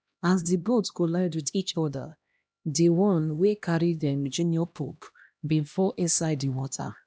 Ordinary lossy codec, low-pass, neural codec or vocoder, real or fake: none; none; codec, 16 kHz, 1 kbps, X-Codec, HuBERT features, trained on LibriSpeech; fake